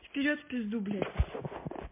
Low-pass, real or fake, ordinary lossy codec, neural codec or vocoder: 3.6 kHz; real; MP3, 24 kbps; none